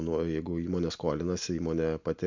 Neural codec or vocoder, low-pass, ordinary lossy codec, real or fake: none; 7.2 kHz; MP3, 64 kbps; real